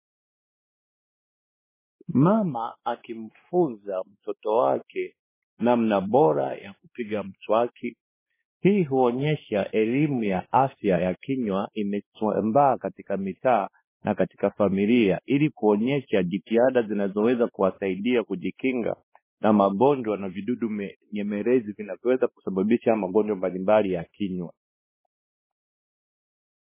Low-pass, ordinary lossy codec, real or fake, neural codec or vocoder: 3.6 kHz; MP3, 16 kbps; fake; codec, 16 kHz, 2 kbps, X-Codec, WavLM features, trained on Multilingual LibriSpeech